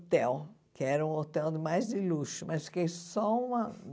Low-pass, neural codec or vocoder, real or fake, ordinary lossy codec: none; none; real; none